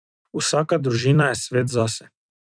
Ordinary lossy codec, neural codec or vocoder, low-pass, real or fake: none; vocoder, 44.1 kHz, 128 mel bands every 256 samples, BigVGAN v2; 9.9 kHz; fake